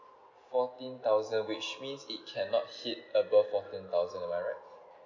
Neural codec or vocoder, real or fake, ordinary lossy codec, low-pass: none; real; none; 7.2 kHz